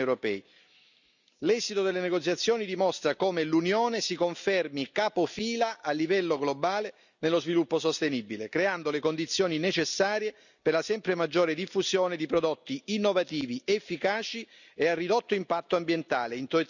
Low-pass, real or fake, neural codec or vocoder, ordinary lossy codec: 7.2 kHz; real; none; none